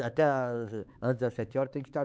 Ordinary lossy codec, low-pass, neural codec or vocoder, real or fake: none; none; codec, 16 kHz, 4 kbps, X-Codec, HuBERT features, trained on LibriSpeech; fake